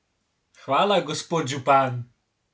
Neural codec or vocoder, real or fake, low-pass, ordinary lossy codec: none; real; none; none